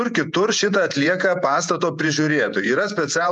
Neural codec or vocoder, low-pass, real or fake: vocoder, 48 kHz, 128 mel bands, Vocos; 10.8 kHz; fake